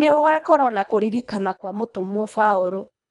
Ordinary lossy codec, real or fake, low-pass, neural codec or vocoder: none; fake; 10.8 kHz; codec, 24 kHz, 1.5 kbps, HILCodec